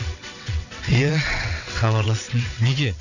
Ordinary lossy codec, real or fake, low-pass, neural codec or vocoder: none; fake; 7.2 kHz; vocoder, 22.05 kHz, 80 mel bands, WaveNeXt